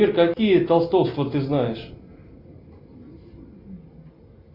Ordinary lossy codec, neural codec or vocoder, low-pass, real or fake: Opus, 64 kbps; none; 5.4 kHz; real